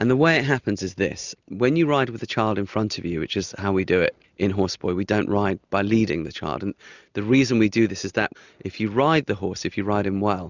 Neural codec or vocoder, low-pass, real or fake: none; 7.2 kHz; real